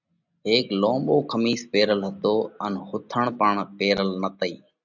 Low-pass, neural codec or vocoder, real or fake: 7.2 kHz; none; real